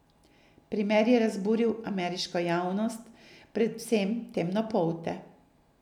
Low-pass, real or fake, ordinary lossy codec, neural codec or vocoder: 19.8 kHz; real; none; none